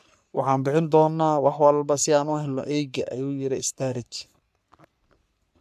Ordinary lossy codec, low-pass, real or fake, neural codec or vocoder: none; 14.4 kHz; fake; codec, 44.1 kHz, 3.4 kbps, Pupu-Codec